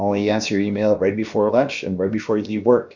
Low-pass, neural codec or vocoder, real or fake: 7.2 kHz; codec, 16 kHz, about 1 kbps, DyCAST, with the encoder's durations; fake